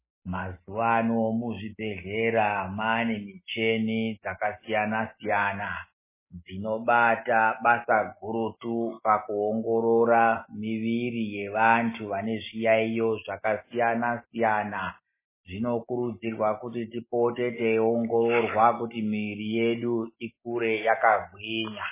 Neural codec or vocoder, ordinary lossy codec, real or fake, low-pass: none; MP3, 16 kbps; real; 3.6 kHz